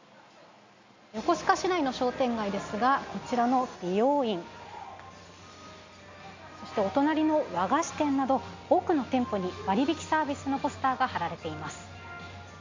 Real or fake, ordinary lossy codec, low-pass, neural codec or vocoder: real; MP3, 48 kbps; 7.2 kHz; none